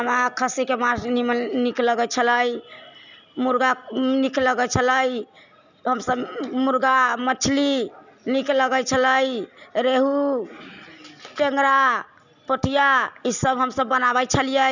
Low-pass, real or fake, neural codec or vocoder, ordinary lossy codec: 7.2 kHz; real; none; none